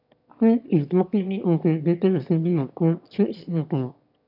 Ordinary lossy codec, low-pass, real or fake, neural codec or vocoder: none; 5.4 kHz; fake; autoencoder, 22.05 kHz, a latent of 192 numbers a frame, VITS, trained on one speaker